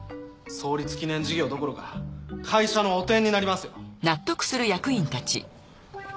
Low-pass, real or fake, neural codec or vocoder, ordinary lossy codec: none; real; none; none